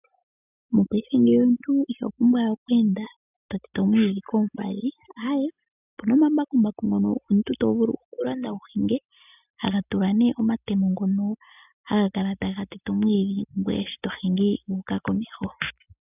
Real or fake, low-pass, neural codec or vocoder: real; 3.6 kHz; none